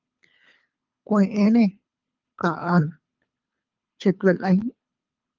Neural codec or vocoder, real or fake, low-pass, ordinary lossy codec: codec, 24 kHz, 3 kbps, HILCodec; fake; 7.2 kHz; Opus, 24 kbps